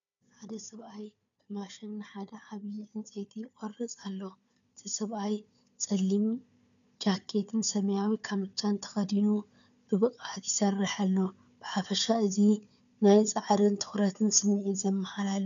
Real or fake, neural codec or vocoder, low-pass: fake; codec, 16 kHz, 4 kbps, FunCodec, trained on Chinese and English, 50 frames a second; 7.2 kHz